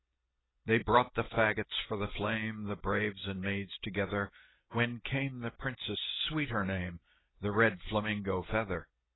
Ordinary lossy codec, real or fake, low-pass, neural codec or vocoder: AAC, 16 kbps; real; 7.2 kHz; none